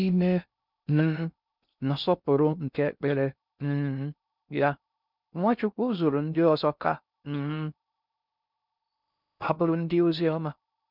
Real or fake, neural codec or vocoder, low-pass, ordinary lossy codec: fake; codec, 16 kHz in and 24 kHz out, 0.6 kbps, FocalCodec, streaming, 2048 codes; 5.4 kHz; none